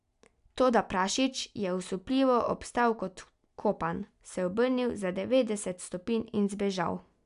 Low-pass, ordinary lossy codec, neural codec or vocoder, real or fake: 10.8 kHz; none; none; real